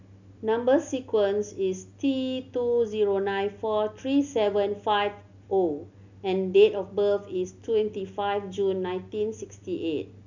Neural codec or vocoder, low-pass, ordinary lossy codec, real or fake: none; 7.2 kHz; none; real